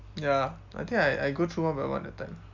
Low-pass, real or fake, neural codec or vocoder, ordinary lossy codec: 7.2 kHz; real; none; none